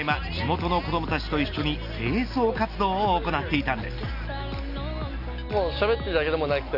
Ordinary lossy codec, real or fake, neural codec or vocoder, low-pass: none; real; none; 5.4 kHz